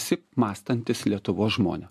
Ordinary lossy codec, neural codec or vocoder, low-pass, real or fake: MP3, 64 kbps; none; 14.4 kHz; real